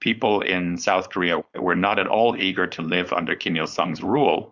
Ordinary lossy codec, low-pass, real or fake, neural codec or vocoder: Opus, 64 kbps; 7.2 kHz; fake; codec, 16 kHz, 4.8 kbps, FACodec